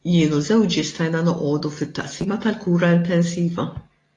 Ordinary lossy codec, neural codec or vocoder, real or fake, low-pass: AAC, 32 kbps; none; real; 10.8 kHz